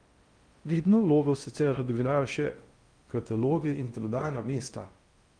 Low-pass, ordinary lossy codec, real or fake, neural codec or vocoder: 9.9 kHz; Opus, 32 kbps; fake; codec, 16 kHz in and 24 kHz out, 0.6 kbps, FocalCodec, streaming, 4096 codes